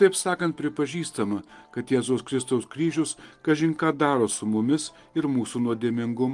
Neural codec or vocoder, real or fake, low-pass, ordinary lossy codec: none; real; 10.8 kHz; Opus, 24 kbps